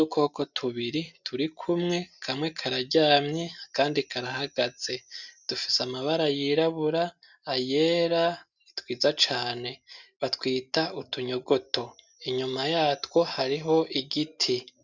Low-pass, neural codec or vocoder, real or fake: 7.2 kHz; none; real